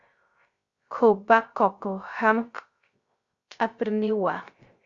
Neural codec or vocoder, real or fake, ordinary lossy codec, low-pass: codec, 16 kHz, 0.3 kbps, FocalCodec; fake; Opus, 64 kbps; 7.2 kHz